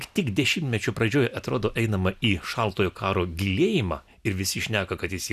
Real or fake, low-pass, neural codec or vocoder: real; 14.4 kHz; none